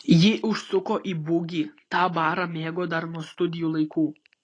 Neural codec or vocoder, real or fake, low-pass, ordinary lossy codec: none; real; 9.9 kHz; AAC, 32 kbps